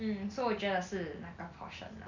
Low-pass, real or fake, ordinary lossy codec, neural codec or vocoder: 7.2 kHz; real; none; none